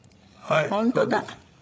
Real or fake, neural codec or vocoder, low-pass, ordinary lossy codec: fake; codec, 16 kHz, 16 kbps, FreqCodec, larger model; none; none